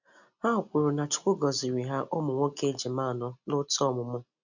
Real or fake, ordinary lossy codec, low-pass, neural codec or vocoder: real; none; 7.2 kHz; none